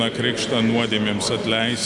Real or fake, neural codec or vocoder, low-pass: real; none; 10.8 kHz